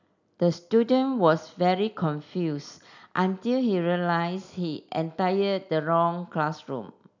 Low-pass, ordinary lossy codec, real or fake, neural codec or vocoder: 7.2 kHz; none; real; none